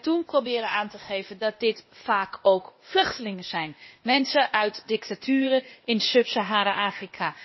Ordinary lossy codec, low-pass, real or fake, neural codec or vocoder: MP3, 24 kbps; 7.2 kHz; fake; codec, 16 kHz, 0.8 kbps, ZipCodec